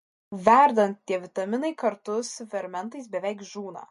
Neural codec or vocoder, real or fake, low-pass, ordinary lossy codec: none; real; 14.4 kHz; MP3, 48 kbps